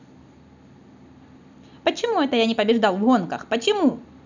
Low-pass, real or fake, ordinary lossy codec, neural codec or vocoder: 7.2 kHz; real; none; none